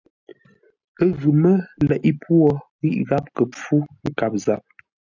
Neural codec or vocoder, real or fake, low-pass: none; real; 7.2 kHz